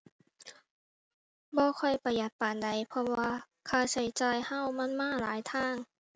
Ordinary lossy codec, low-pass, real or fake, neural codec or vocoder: none; none; real; none